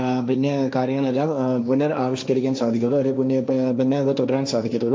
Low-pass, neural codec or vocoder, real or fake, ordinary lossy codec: none; codec, 16 kHz, 1.1 kbps, Voila-Tokenizer; fake; none